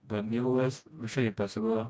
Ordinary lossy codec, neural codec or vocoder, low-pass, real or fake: none; codec, 16 kHz, 1 kbps, FreqCodec, smaller model; none; fake